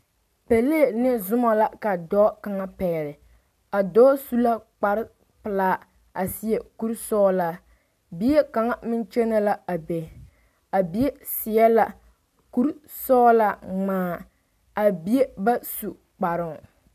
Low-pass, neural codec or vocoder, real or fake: 14.4 kHz; none; real